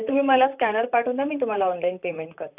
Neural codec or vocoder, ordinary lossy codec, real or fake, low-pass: vocoder, 44.1 kHz, 128 mel bands, Pupu-Vocoder; none; fake; 3.6 kHz